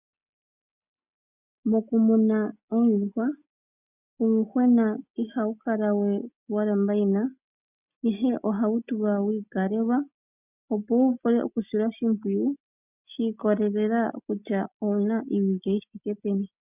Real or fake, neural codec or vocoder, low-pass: real; none; 3.6 kHz